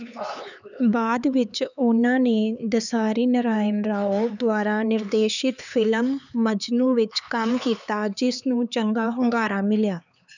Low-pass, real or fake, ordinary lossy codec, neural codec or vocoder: 7.2 kHz; fake; none; codec, 16 kHz, 4 kbps, X-Codec, HuBERT features, trained on LibriSpeech